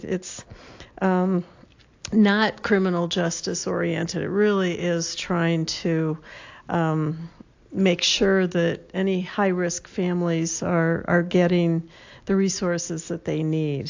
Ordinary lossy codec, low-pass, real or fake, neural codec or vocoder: AAC, 48 kbps; 7.2 kHz; real; none